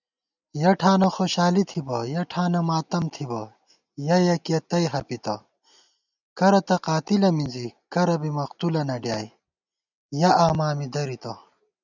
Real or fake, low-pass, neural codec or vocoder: real; 7.2 kHz; none